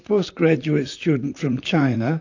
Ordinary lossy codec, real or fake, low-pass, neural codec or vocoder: AAC, 48 kbps; fake; 7.2 kHz; vocoder, 44.1 kHz, 128 mel bands, Pupu-Vocoder